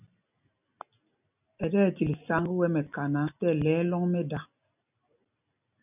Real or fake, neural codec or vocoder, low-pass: real; none; 3.6 kHz